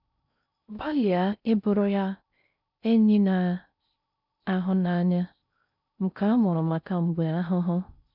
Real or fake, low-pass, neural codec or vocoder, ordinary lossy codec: fake; 5.4 kHz; codec, 16 kHz in and 24 kHz out, 0.6 kbps, FocalCodec, streaming, 4096 codes; none